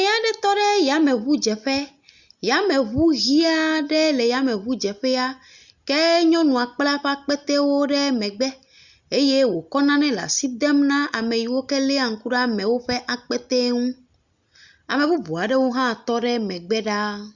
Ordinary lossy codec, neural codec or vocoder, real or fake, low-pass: Opus, 64 kbps; none; real; 7.2 kHz